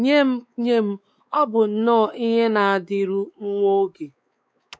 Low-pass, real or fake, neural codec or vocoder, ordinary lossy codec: none; fake; codec, 16 kHz, 4 kbps, X-Codec, WavLM features, trained on Multilingual LibriSpeech; none